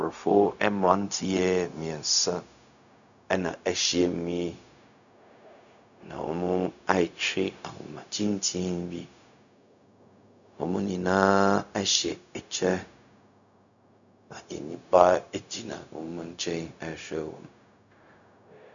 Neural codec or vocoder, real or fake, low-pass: codec, 16 kHz, 0.4 kbps, LongCat-Audio-Codec; fake; 7.2 kHz